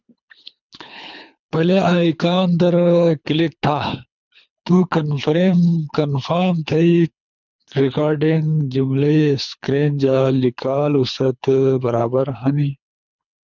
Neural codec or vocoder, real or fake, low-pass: codec, 24 kHz, 3 kbps, HILCodec; fake; 7.2 kHz